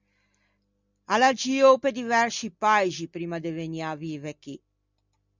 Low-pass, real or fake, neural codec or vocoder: 7.2 kHz; real; none